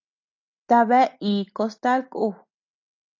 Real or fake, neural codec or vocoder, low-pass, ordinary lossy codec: real; none; 7.2 kHz; AAC, 48 kbps